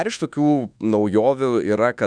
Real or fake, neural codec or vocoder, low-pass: fake; codec, 24 kHz, 1.2 kbps, DualCodec; 9.9 kHz